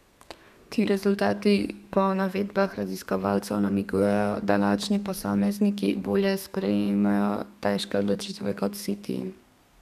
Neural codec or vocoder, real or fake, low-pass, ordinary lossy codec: codec, 32 kHz, 1.9 kbps, SNAC; fake; 14.4 kHz; none